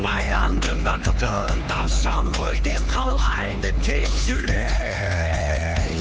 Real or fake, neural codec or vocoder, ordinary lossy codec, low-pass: fake; codec, 16 kHz, 2 kbps, X-Codec, HuBERT features, trained on LibriSpeech; none; none